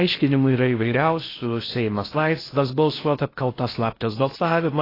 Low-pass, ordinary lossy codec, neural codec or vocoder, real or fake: 5.4 kHz; AAC, 24 kbps; codec, 16 kHz in and 24 kHz out, 0.6 kbps, FocalCodec, streaming, 4096 codes; fake